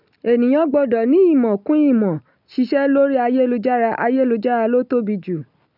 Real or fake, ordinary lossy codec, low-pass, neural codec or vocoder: real; none; 5.4 kHz; none